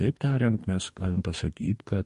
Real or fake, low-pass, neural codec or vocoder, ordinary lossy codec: fake; 14.4 kHz; codec, 44.1 kHz, 2.6 kbps, DAC; MP3, 48 kbps